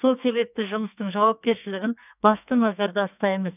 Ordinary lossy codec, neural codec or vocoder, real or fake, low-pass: none; codec, 44.1 kHz, 2.6 kbps, SNAC; fake; 3.6 kHz